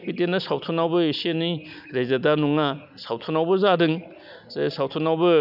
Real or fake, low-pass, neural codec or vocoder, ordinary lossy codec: real; 5.4 kHz; none; none